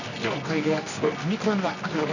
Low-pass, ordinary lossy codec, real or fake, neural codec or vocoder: 7.2 kHz; none; fake; codec, 24 kHz, 0.9 kbps, WavTokenizer, medium music audio release